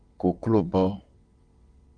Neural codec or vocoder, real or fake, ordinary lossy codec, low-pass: vocoder, 22.05 kHz, 80 mel bands, WaveNeXt; fake; Opus, 24 kbps; 9.9 kHz